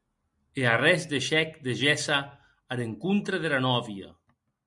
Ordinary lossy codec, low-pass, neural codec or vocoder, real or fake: MP3, 64 kbps; 10.8 kHz; none; real